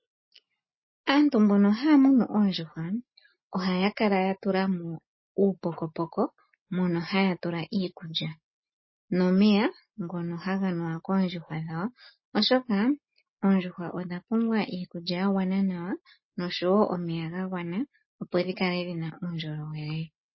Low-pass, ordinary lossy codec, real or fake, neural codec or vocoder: 7.2 kHz; MP3, 24 kbps; real; none